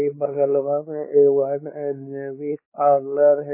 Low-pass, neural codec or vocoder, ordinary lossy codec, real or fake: 3.6 kHz; codec, 16 kHz, 4 kbps, X-Codec, WavLM features, trained on Multilingual LibriSpeech; MP3, 24 kbps; fake